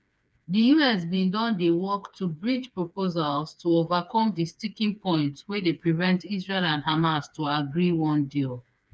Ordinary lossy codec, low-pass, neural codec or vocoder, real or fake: none; none; codec, 16 kHz, 4 kbps, FreqCodec, smaller model; fake